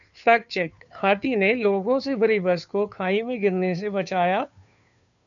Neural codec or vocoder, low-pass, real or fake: codec, 16 kHz, 2 kbps, FunCodec, trained on Chinese and English, 25 frames a second; 7.2 kHz; fake